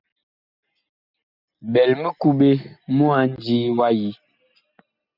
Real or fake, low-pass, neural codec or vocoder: real; 5.4 kHz; none